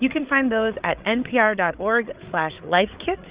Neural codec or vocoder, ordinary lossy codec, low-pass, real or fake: codec, 16 kHz, 8 kbps, FreqCodec, larger model; Opus, 64 kbps; 3.6 kHz; fake